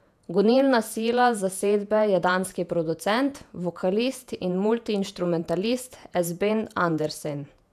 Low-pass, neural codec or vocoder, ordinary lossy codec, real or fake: 14.4 kHz; vocoder, 48 kHz, 128 mel bands, Vocos; none; fake